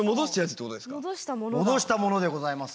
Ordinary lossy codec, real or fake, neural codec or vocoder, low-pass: none; real; none; none